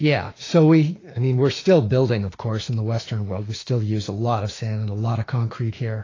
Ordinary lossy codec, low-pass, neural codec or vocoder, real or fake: AAC, 32 kbps; 7.2 kHz; autoencoder, 48 kHz, 32 numbers a frame, DAC-VAE, trained on Japanese speech; fake